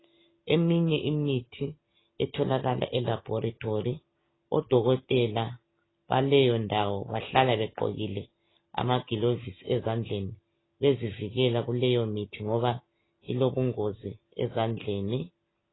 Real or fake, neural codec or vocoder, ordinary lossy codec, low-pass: real; none; AAC, 16 kbps; 7.2 kHz